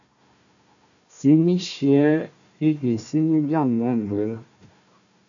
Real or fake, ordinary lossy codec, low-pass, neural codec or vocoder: fake; MP3, 96 kbps; 7.2 kHz; codec, 16 kHz, 1 kbps, FunCodec, trained on Chinese and English, 50 frames a second